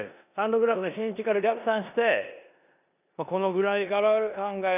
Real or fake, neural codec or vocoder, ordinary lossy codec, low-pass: fake; codec, 16 kHz in and 24 kHz out, 0.9 kbps, LongCat-Audio-Codec, four codebook decoder; none; 3.6 kHz